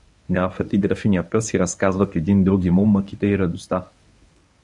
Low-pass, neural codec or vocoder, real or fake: 10.8 kHz; codec, 24 kHz, 0.9 kbps, WavTokenizer, medium speech release version 1; fake